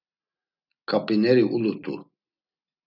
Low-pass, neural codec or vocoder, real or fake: 5.4 kHz; none; real